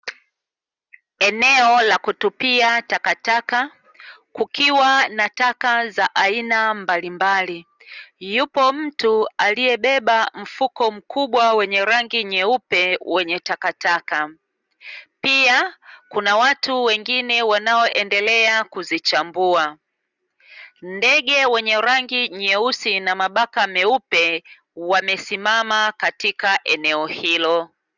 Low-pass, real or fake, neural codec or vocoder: 7.2 kHz; real; none